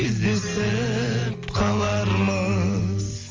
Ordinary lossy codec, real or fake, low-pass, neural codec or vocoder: Opus, 32 kbps; real; 7.2 kHz; none